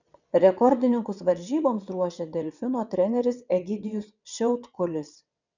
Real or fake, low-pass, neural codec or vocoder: fake; 7.2 kHz; vocoder, 22.05 kHz, 80 mel bands, WaveNeXt